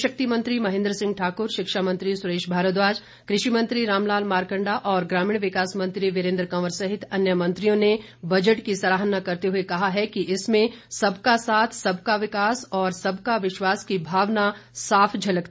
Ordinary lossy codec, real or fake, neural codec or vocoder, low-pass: none; real; none; none